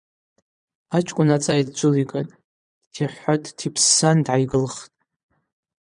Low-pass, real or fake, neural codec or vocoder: 9.9 kHz; fake; vocoder, 22.05 kHz, 80 mel bands, Vocos